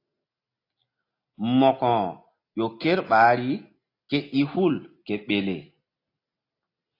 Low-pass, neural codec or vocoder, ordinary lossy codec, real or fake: 5.4 kHz; none; AAC, 32 kbps; real